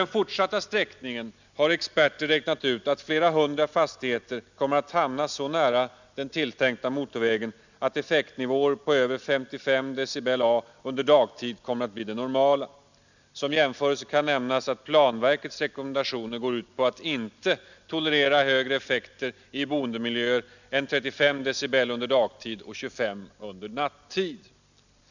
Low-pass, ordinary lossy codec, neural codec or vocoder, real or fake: 7.2 kHz; none; none; real